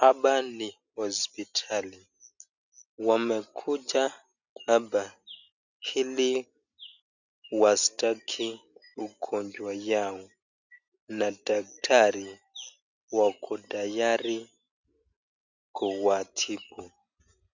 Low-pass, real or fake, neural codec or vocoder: 7.2 kHz; real; none